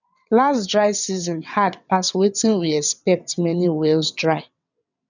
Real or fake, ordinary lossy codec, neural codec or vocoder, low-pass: fake; none; vocoder, 22.05 kHz, 80 mel bands, WaveNeXt; 7.2 kHz